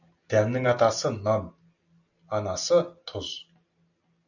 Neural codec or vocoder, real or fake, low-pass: none; real; 7.2 kHz